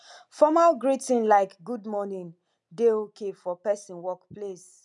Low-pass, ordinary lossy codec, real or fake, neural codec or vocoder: 10.8 kHz; none; real; none